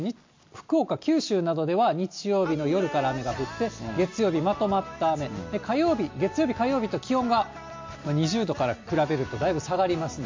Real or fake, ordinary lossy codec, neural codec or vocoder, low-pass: real; MP3, 48 kbps; none; 7.2 kHz